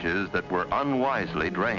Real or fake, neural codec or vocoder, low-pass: real; none; 7.2 kHz